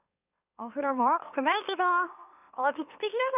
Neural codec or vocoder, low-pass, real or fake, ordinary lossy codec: autoencoder, 44.1 kHz, a latent of 192 numbers a frame, MeloTTS; 3.6 kHz; fake; none